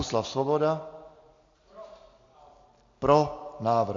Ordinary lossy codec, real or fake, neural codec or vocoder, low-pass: AAC, 48 kbps; real; none; 7.2 kHz